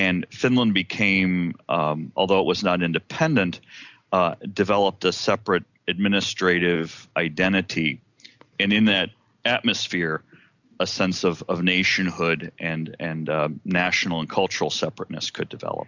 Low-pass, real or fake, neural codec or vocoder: 7.2 kHz; real; none